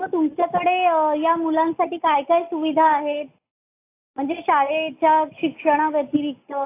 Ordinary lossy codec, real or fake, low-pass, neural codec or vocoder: AAC, 24 kbps; real; 3.6 kHz; none